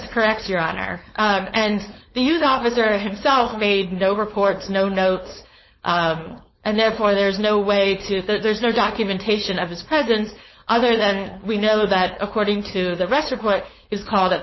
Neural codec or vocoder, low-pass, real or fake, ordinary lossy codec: codec, 16 kHz, 4.8 kbps, FACodec; 7.2 kHz; fake; MP3, 24 kbps